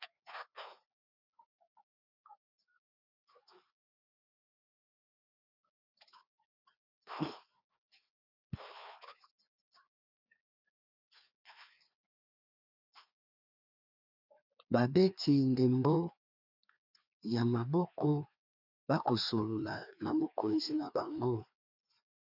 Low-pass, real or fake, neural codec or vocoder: 5.4 kHz; fake; codec, 16 kHz, 2 kbps, FreqCodec, larger model